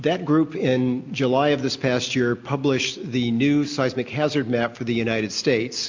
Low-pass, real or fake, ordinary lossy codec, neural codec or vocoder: 7.2 kHz; real; MP3, 48 kbps; none